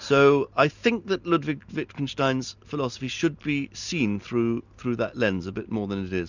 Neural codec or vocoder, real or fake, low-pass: none; real; 7.2 kHz